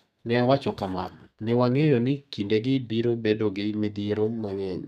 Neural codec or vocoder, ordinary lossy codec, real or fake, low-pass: codec, 32 kHz, 1.9 kbps, SNAC; none; fake; 14.4 kHz